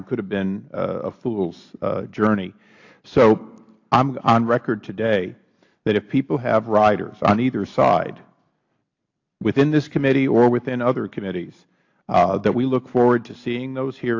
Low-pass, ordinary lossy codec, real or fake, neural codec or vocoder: 7.2 kHz; AAC, 48 kbps; real; none